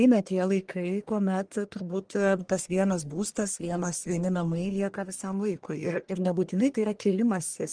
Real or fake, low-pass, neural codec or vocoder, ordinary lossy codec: fake; 9.9 kHz; codec, 44.1 kHz, 1.7 kbps, Pupu-Codec; Opus, 24 kbps